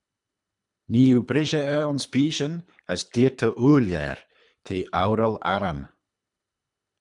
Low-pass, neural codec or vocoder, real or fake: 10.8 kHz; codec, 24 kHz, 3 kbps, HILCodec; fake